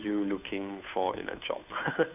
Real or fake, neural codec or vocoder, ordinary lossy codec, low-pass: fake; codec, 16 kHz, 2 kbps, FunCodec, trained on Chinese and English, 25 frames a second; none; 3.6 kHz